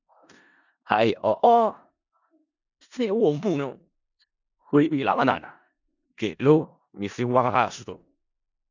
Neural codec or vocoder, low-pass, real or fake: codec, 16 kHz in and 24 kHz out, 0.4 kbps, LongCat-Audio-Codec, four codebook decoder; 7.2 kHz; fake